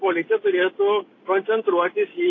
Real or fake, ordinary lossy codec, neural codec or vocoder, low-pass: real; AAC, 32 kbps; none; 7.2 kHz